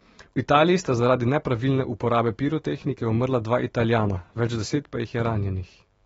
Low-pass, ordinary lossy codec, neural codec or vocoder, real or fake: 19.8 kHz; AAC, 24 kbps; autoencoder, 48 kHz, 128 numbers a frame, DAC-VAE, trained on Japanese speech; fake